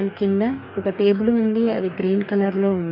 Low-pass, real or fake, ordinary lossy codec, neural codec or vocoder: 5.4 kHz; fake; none; codec, 44.1 kHz, 2.6 kbps, DAC